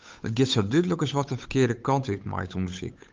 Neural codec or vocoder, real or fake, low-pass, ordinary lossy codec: codec, 16 kHz, 8 kbps, FunCodec, trained on Chinese and English, 25 frames a second; fake; 7.2 kHz; Opus, 24 kbps